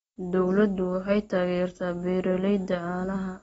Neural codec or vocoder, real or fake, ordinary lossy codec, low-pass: none; real; AAC, 24 kbps; 19.8 kHz